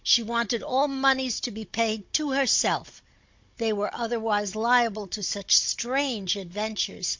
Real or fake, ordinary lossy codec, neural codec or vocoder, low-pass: fake; MP3, 48 kbps; codec, 16 kHz, 16 kbps, FunCodec, trained on Chinese and English, 50 frames a second; 7.2 kHz